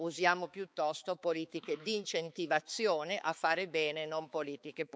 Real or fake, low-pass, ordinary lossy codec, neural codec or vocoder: fake; none; none; codec, 16 kHz, 4 kbps, X-Codec, HuBERT features, trained on balanced general audio